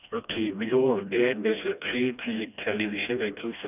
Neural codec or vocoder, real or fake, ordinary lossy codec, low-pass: codec, 16 kHz, 1 kbps, FreqCodec, smaller model; fake; none; 3.6 kHz